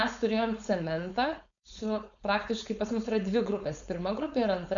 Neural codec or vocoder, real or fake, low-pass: codec, 16 kHz, 4.8 kbps, FACodec; fake; 7.2 kHz